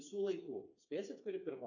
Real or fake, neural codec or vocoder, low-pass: fake; codec, 16 kHz, 4 kbps, FreqCodec, smaller model; 7.2 kHz